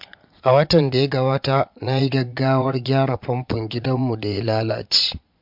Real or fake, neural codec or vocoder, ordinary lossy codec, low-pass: fake; vocoder, 22.05 kHz, 80 mel bands, Vocos; MP3, 48 kbps; 5.4 kHz